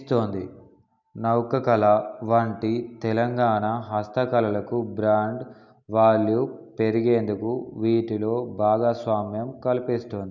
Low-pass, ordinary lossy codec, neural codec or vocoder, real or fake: 7.2 kHz; none; none; real